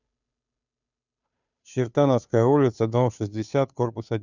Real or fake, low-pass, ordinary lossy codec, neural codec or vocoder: fake; 7.2 kHz; none; codec, 16 kHz, 2 kbps, FunCodec, trained on Chinese and English, 25 frames a second